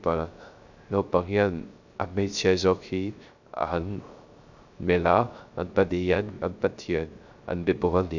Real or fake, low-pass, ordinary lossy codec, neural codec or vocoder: fake; 7.2 kHz; none; codec, 16 kHz, 0.3 kbps, FocalCodec